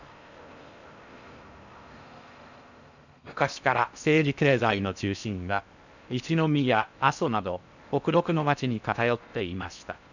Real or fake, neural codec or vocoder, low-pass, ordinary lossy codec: fake; codec, 16 kHz in and 24 kHz out, 0.6 kbps, FocalCodec, streaming, 4096 codes; 7.2 kHz; none